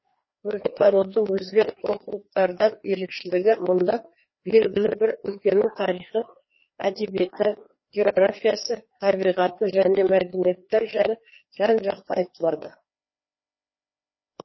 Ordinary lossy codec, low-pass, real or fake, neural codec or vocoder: MP3, 24 kbps; 7.2 kHz; fake; codec, 16 kHz, 2 kbps, FreqCodec, larger model